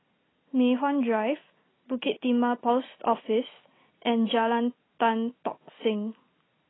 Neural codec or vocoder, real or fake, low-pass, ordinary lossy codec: none; real; 7.2 kHz; AAC, 16 kbps